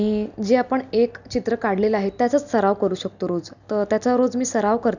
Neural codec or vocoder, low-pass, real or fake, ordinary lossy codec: none; 7.2 kHz; real; none